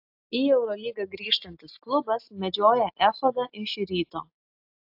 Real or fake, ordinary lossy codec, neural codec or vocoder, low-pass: real; AAC, 48 kbps; none; 5.4 kHz